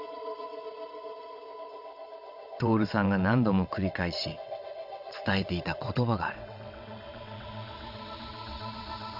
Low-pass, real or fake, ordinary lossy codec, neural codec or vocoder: 5.4 kHz; fake; none; vocoder, 22.05 kHz, 80 mel bands, WaveNeXt